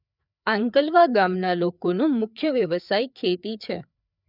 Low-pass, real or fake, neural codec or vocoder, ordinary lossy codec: 5.4 kHz; fake; codec, 16 kHz, 4 kbps, FreqCodec, larger model; none